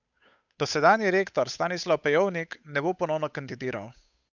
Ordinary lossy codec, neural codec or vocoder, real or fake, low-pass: none; codec, 16 kHz, 8 kbps, FunCodec, trained on Chinese and English, 25 frames a second; fake; 7.2 kHz